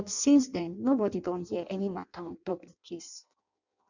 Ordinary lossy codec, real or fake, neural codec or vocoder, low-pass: none; fake; codec, 16 kHz in and 24 kHz out, 0.6 kbps, FireRedTTS-2 codec; 7.2 kHz